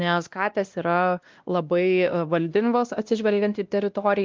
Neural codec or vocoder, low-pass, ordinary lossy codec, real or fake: codec, 16 kHz, 1 kbps, X-Codec, WavLM features, trained on Multilingual LibriSpeech; 7.2 kHz; Opus, 32 kbps; fake